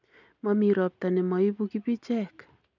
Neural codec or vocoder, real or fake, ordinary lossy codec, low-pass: none; real; none; 7.2 kHz